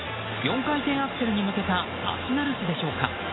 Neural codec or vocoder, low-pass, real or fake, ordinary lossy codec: none; 7.2 kHz; real; AAC, 16 kbps